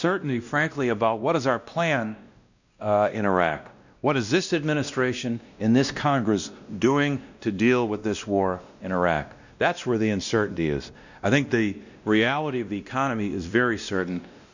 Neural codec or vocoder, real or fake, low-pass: codec, 16 kHz, 1 kbps, X-Codec, WavLM features, trained on Multilingual LibriSpeech; fake; 7.2 kHz